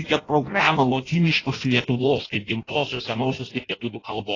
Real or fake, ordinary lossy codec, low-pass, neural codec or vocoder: fake; AAC, 32 kbps; 7.2 kHz; codec, 16 kHz in and 24 kHz out, 0.6 kbps, FireRedTTS-2 codec